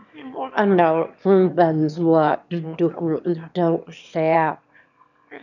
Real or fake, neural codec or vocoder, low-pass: fake; autoencoder, 22.05 kHz, a latent of 192 numbers a frame, VITS, trained on one speaker; 7.2 kHz